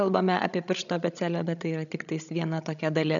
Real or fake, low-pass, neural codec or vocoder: fake; 7.2 kHz; codec, 16 kHz, 16 kbps, FreqCodec, larger model